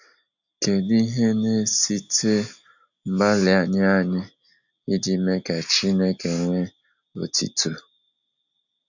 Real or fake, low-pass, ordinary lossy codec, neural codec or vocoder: real; 7.2 kHz; none; none